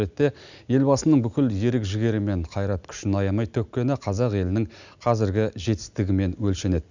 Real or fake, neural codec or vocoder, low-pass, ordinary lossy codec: real; none; 7.2 kHz; none